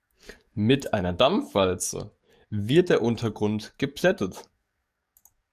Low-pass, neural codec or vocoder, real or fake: 14.4 kHz; codec, 44.1 kHz, 7.8 kbps, DAC; fake